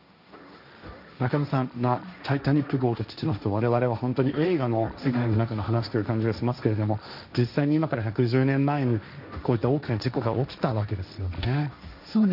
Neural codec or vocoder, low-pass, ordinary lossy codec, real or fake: codec, 16 kHz, 1.1 kbps, Voila-Tokenizer; 5.4 kHz; none; fake